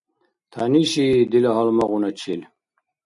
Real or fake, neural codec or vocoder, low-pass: real; none; 10.8 kHz